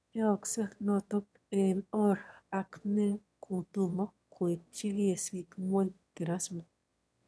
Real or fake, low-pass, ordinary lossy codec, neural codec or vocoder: fake; none; none; autoencoder, 22.05 kHz, a latent of 192 numbers a frame, VITS, trained on one speaker